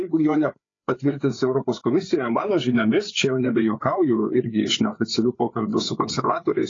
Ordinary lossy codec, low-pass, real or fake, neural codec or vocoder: AAC, 32 kbps; 7.2 kHz; fake; codec, 16 kHz, 4 kbps, FreqCodec, larger model